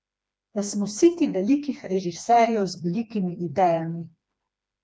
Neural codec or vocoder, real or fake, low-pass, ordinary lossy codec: codec, 16 kHz, 2 kbps, FreqCodec, smaller model; fake; none; none